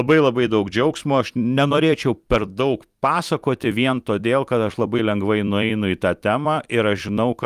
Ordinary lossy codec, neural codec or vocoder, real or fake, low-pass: Opus, 32 kbps; vocoder, 44.1 kHz, 128 mel bands every 256 samples, BigVGAN v2; fake; 14.4 kHz